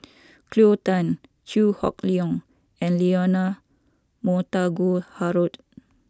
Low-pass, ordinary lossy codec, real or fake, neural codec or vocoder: none; none; real; none